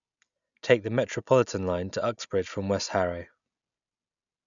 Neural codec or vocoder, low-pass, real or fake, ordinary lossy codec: none; 7.2 kHz; real; none